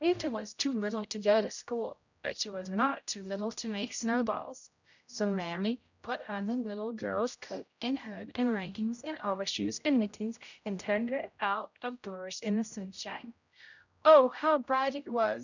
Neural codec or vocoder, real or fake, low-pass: codec, 16 kHz, 0.5 kbps, X-Codec, HuBERT features, trained on general audio; fake; 7.2 kHz